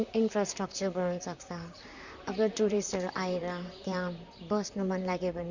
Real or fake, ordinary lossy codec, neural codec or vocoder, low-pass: fake; none; vocoder, 44.1 kHz, 128 mel bands, Pupu-Vocoder; 7.2 kHz